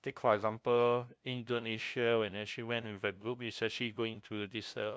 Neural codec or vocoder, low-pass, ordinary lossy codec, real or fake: codec, 16 kHz, 0.5 kbps, FunCodec, trained on LibriTTS, 25 frames a second; none; none; fake